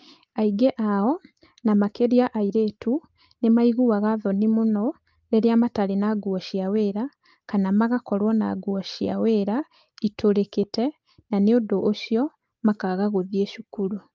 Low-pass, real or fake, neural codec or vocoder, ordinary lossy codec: 7.2 kHz; real; none; Opus, 24 kbps